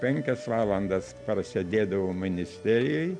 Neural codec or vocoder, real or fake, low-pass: none; real; 9.9 kHz